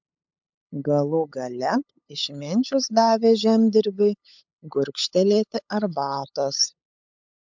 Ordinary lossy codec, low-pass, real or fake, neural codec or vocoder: MP3, 64 kbps; 7.2 kHz; fake; codec, 16 kHz, 8 kbps, FunCodec, trained on LibriTTS, 25 frames a second